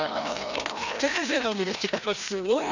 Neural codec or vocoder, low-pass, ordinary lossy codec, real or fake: codec, 16 kHz, 1 kbps, FreqCodec, larger model; 7.2 kHz; none; fake